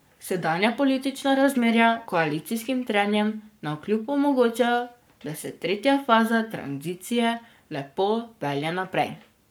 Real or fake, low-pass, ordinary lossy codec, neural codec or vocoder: fake; none; none; codec, 44.1 kHz, 7.8 kbps, Pupu-Codec